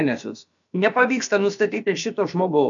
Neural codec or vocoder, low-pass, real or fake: codec, 16 kHz, about 1 kbps, DyCAST, with the encoder's durations; 7.2 kHz; fake